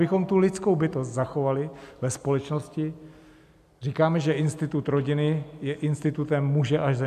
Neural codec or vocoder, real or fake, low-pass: none; real; 14.4 kHz